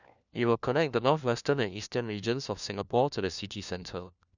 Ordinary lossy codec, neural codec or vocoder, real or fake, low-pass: none; codec, 16 kHz, 1 kbps, FunCodec, trained on LibriTTS, 50 frames a second; fake; 7.2 kHz